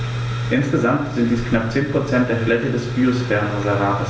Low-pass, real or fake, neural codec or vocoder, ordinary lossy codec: none; real; none; none